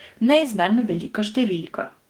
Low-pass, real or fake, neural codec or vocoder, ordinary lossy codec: 19.8 kHz; fake; codec, 44.1 kHz, 2.6 kbps, DAC; Opus, 24 kbps